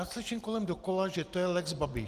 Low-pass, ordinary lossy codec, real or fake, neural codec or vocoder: 14.4 kHz; Opus, 24 kbps; real; none